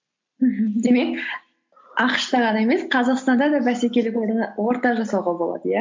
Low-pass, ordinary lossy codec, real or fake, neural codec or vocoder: 7.2 kHz; MP3, 48 kbps; real; none